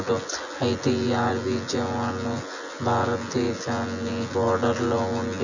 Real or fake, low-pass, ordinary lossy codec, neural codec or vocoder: fake; 7.2 kHz; none; vocoder, 24 kHz, 100 mel bands, Vocos